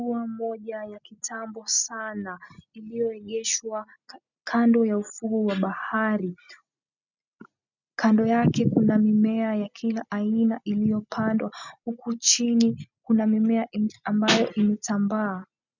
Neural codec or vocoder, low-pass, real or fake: none; 7.2 kHz; real